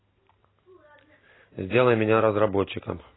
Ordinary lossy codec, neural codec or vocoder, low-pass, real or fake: AAC, 16 kbps; none; 7.2 kHz; real